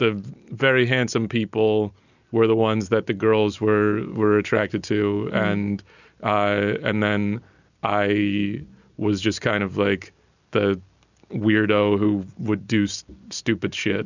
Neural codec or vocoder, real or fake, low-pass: none; real; 7.2 kHz